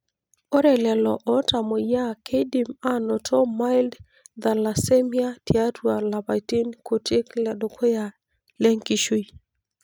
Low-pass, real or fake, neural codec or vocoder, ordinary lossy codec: none; real; none; none